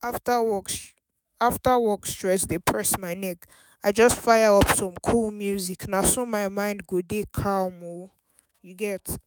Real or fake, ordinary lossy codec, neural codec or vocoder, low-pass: fake; none; autoencoder, 48 kHz, 128 numbers a frame, DAC-VAE, trained on Japanese speech; none